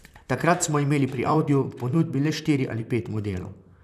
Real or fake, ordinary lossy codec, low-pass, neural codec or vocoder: fake; none; 14.4 kHz; vocoder, 44.1 kHz, 128 mel bands, Pupu-Vocoder